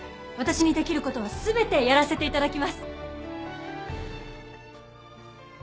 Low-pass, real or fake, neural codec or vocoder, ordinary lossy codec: none; real; none; none